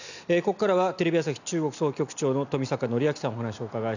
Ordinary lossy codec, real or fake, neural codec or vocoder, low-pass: none; real; none; 7.2 kHz